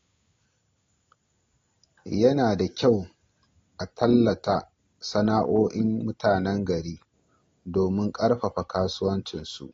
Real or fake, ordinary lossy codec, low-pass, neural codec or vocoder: real; AAC, 32 kbps; 7.2 kHz; none